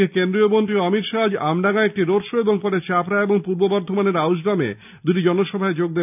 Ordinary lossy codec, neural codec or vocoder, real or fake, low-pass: none; none; real; 3.6 kHz